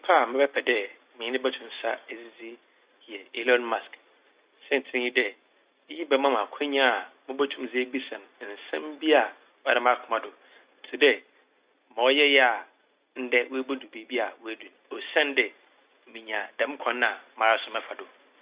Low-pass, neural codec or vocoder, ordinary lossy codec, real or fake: 3.6 kHz; none; Opus, 64 kbps; real